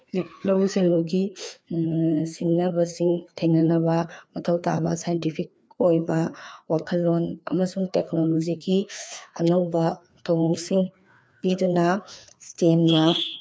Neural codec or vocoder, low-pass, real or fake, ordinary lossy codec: codec, 16 kHz, 2 kbps, FreqCodec, larger model; none; fake; none